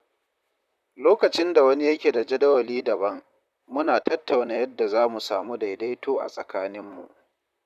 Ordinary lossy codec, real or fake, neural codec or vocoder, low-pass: none; fake; vocoder, 44.1 kHz, 128 mel bands, Pupu-Vocoder; 14.4 kHz